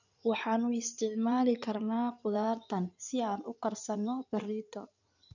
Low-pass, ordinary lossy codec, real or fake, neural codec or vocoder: 7.2 kHz; none; fake; codec, 16 kHz in and 24 kHz out, 2.2 kbps, FireRedTTS-2 codec